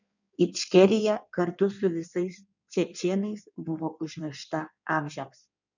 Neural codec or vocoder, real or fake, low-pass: codec, 16 kHz in and 24 kHz out, 1.1 kbps, FireRedTTS-2 codec; fake; 7.2 kHz